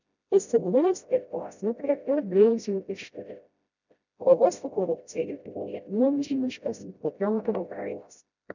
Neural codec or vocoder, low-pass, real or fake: codec, 16 kHz, 0.5 kbps, FreqCodec, smaller model; 7.2 kHz; fake